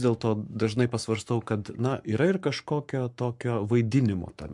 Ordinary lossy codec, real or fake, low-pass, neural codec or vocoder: MP3, 64 kbps; fake; 10.8 kHz; codec, 44.1 kHz, 7.8 kbps, Pupu-Codec